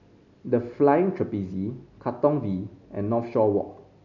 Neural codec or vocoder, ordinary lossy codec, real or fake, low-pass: none; none; real; 7.2 kHz